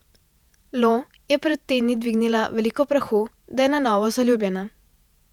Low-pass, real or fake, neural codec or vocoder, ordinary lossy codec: 19.8 kHz; fake; vocoder, 48 kHz, 128 mel bands, Vocos; none